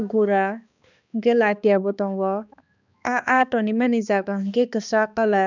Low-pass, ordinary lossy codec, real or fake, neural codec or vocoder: 7.2 kHz; none; fake; codec, 16 kHz, 2 kbps, X-Codec, HuBERT features, trained on balanced general audio